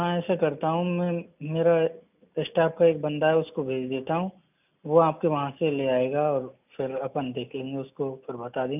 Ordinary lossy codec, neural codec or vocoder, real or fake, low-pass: none; none; real; 3.6 kHz